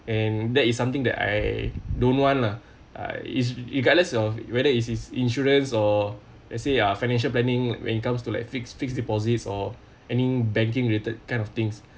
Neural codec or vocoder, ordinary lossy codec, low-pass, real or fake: none; none; none; real